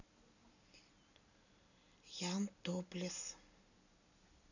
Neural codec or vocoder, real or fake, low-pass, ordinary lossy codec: none; real; 7.2 kHz; none